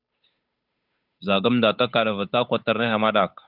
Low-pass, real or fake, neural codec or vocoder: 5.4 kHz; fake; codec, 16 kHz, 8 kbps, FunCodec, trained on Chinese and English, 25 frames a second